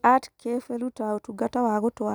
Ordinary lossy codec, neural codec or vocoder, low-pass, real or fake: none; none; none; real